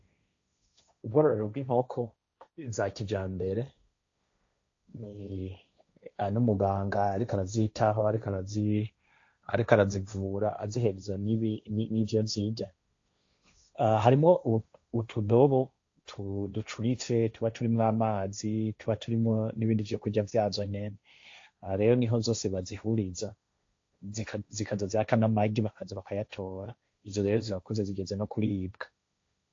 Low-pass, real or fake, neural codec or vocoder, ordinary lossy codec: 7.2 kHz; fake; codec, 16 kHz, 1.1 kbps, Voila-Tokenizer; AAC, 48 kbps